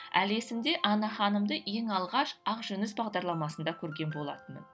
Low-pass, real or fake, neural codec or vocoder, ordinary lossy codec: none; real; none; none